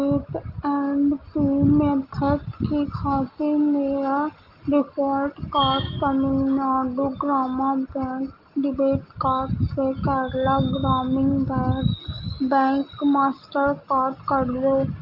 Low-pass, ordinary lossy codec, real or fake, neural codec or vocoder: 5.4 kHz; Opus, 24 kbps; real; none